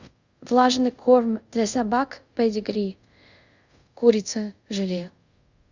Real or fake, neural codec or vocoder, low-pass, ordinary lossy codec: fake; codec, 24 kHz, 0.5 kbps, DualCodec; 7.2 kHz; Opus, 64 kbps